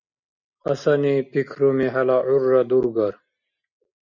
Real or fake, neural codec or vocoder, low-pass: real; none; 7.2 kHz